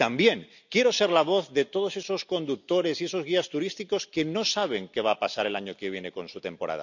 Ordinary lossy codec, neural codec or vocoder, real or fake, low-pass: none; none; real; 7.2 kHz